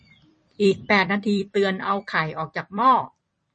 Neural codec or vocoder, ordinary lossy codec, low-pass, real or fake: vocoder, 44.1 kHz, 128 mel bands every 256 samples, BigVGAN v2; MP3, 32 kbps; 10.8 kHz; fake